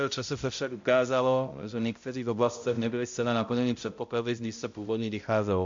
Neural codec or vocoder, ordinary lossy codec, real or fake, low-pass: codec, 16 kHz, 0.5 kbps, X-Codec, HuBERT features, trained on balanced general audio; MP3, 48 kbps; fake; 7.2 kHz